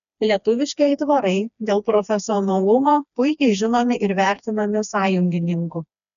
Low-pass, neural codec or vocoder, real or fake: 7.2 kHz; codec, 16 kHz, 2 kbps, FreqCodec, smaller model; fake